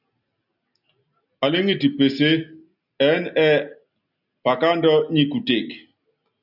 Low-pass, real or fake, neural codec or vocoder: 5.4 kHz; real; none